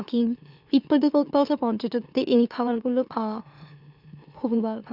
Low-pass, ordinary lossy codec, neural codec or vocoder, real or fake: 5.4 kHz; none; autoencoder, 44.1 kHz, a latent of 192 numbers a frame, MeloTTS; fake